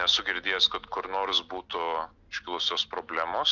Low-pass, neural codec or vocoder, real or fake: 7.2 kHz; none; real